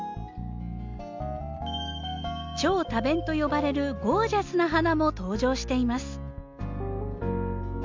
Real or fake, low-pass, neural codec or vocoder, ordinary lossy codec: real; 7.2 kHz; none; MP3, 64 kbps